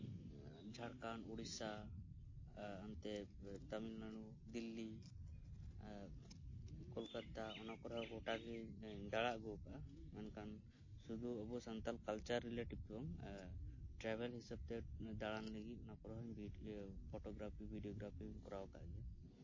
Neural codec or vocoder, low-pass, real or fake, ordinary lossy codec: none; 7.2 kHz; real; MP3, 32 kbps